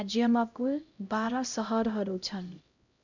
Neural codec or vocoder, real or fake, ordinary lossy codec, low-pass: codec, 16 kHz, 0.5 kbps, X-Codec, HuBERT features, trained on LibriSpeech; fake; none; 7.2 kHz